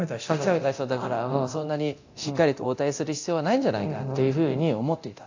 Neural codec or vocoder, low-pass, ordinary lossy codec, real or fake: codec, 24 kHz, 0.9 kbps, DualCodec; 7.2 kHz; AAC, 48 kbps; fake